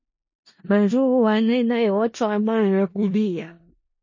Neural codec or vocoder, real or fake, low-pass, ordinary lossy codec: codec, 16 kHz in and 24 kHz out, 0.4 kbps, LongCat-Audio-Codec, four codebook decoder; fake; 7.2 kHz; MP3, 32 kbps